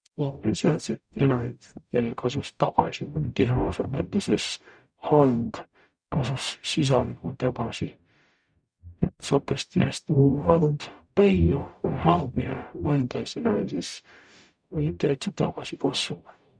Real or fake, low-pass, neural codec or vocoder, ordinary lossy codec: fake; 9.9 kHz; codec, 44.1 kHz, 0.9 kbps, DAC; none